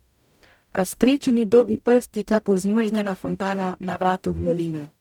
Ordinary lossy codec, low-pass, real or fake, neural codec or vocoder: none; 19.8 kHz; fake; codec, 44.1 kHz, 0.9 kbps, DAC